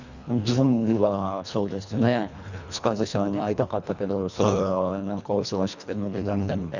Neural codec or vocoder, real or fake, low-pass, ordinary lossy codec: codec, 24 kHz, 1.5 kbps, HILCodec; fake; 7.2 kHz; none